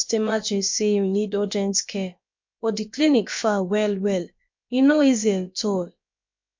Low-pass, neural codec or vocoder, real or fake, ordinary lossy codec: 7.2 kHz; codec, 16 kHz, about 1 kbps, DyCAST, with the encoder's durations; fake; MP3, 48 kbps